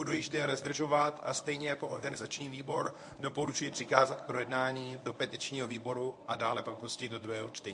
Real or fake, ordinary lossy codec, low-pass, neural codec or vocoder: fake; MP3, 48 kbps; 10.8 kHz; codec, 24 kHz, 0.9 kbps, WavTokenizer, medium speech release version 1